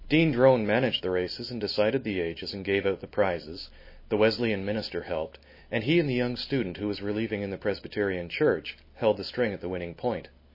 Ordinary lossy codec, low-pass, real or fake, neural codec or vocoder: MP3, 24 kbps; 5.4 kHz; real; none